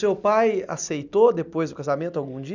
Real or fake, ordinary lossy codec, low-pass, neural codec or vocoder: real; none; 7.2 kHz; none